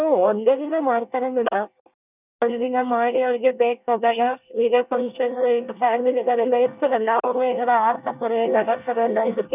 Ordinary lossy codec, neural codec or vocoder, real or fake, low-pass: none; codec, 24 kHz, 1 kbps, SNAC; fake; 3.6 kHz